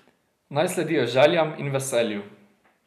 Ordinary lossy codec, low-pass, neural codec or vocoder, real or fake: none; 14.4 kHz; none; real